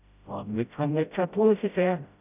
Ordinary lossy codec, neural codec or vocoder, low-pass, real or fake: none; codec, 16 kHz, 0.5 kbps, FreqCodec, smaller model; 3.6 kHz; fake